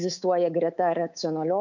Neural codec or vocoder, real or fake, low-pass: codec, 24 kHz, 3.1 kbps, DualCodec; fake; 7.2 kHz